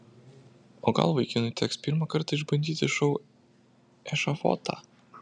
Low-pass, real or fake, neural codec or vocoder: 9.9 kHz; real; none